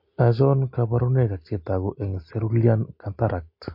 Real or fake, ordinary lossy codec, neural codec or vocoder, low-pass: real; MP3, 32 kbps; none; 5.4 kHz